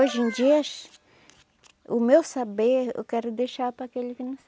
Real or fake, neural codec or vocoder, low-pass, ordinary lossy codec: real; none; none; none